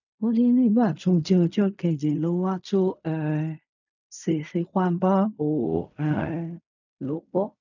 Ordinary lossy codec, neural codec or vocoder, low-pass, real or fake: none; codec, 16 kHz in and 24 kHz out, 0.4 kbps, LongCat-Audio-Codec, fine tuned four codebook decoder; 7.2 kHz; fake